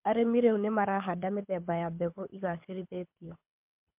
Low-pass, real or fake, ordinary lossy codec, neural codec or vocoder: 3.6 kHz; fake; MP3, 32 kbps; codec, 24 kHz, 6 kbps, HILCodec